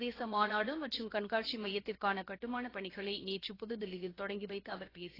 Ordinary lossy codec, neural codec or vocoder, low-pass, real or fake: AAC, 24 kbps; codec, 16 kHz, 0.7 kbps, FocalCodec; 5.4 kHz; fake